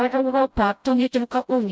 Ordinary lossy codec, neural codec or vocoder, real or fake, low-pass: none; codec, 16 kHz, 0.5 kbps, FreqCodec, smaller model; fake; none